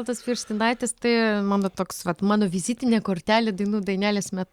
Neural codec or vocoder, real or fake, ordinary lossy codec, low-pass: none; real; Opus, 64 kbps; 19.8 kHz